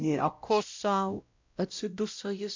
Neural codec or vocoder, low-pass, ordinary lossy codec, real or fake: codec, 16 kHz, 0.5 kbps, X-Codec, WavLM features, trained on Multilingual LibriSpeech; 7.2 kHz; MP3, 48 kbps; fake